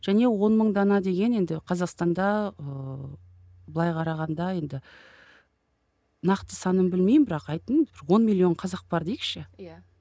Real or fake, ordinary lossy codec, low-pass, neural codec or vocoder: real; none; none; none